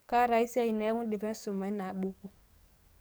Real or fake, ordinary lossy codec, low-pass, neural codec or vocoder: fake; none; none; codec, 44.1 kHz, 7.8 kbps, DAC